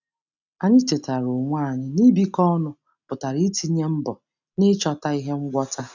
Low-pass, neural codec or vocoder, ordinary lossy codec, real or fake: 7.2 kHz; none; none; real